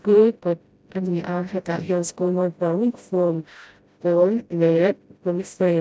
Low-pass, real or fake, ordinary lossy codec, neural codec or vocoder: none; fake; none; codec, 16 kHz, 0.5 kbps, FreqCodec, smaller model